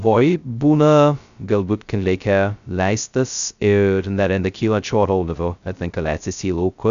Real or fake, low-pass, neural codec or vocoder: fake; 7.2 kHz; codec, 16 kHz, 0.2 kbps, FocalCodec